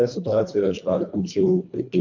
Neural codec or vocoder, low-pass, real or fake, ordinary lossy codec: codec, 24 kHz, 1.5 kbps, HILCodec; 7.2 kHz; fake; MP3, 64 kbps